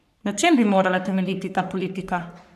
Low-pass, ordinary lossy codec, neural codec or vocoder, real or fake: 14.4 kHz; none; codec, 44.1 kHz, 3.4 kbps, Pupu-Codec; fake